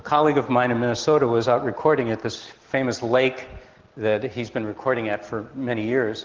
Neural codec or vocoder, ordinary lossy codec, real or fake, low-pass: none; Opus, 16 kbps; real; 7.2 kHz